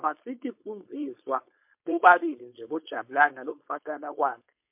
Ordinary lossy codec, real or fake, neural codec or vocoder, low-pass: MP3, 32 kbps; fake; codec, 16 kHz, 4.8 kbps, FACodec; 3.6 kHz